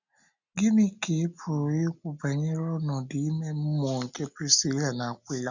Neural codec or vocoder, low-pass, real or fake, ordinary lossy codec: none; 7.2 kHz; real; none